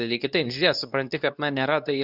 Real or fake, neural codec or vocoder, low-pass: fake; codec, 24 kHz, 0.9 kbps, WavTokenizer, medium speech release version 2; 5.4 kHz